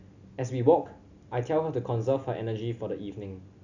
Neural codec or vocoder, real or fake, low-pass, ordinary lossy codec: none; real; 7.2 kHz; none